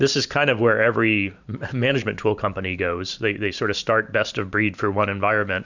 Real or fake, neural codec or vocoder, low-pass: fake; vocoder, 44.1 kHz, 128 mel bands every 512 samples, BigVGAN v2; 7.2 kHz